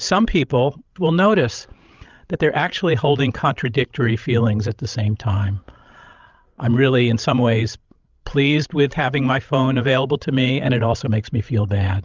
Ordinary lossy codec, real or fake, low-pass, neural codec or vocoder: Opus, 24 kbps; fake; 7.2 kHz; codec, 16 kHz, 16 kbps, FreqCodec, larger model